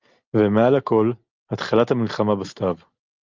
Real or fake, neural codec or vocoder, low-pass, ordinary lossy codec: real; none; 7.2 kHz; Opus, 24 kbps